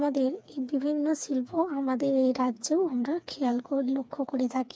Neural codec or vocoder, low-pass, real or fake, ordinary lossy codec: codec, 16 kHz, 4 kbps, FreqCodec, smaller model; none; fake; none